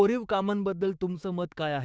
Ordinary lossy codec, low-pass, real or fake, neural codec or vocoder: none; none; fake; codec, 16 kHz, 6 kbps, DAC